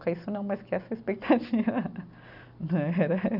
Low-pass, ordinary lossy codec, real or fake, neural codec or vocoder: 5.4 kHz; none; real; none